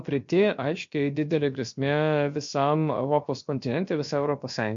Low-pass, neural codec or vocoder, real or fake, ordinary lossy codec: 7.2 kHz; codec, 16 kHz, about 1 kbps, DyCAST, with the encoder's durations; fake; MP3, 48 kbps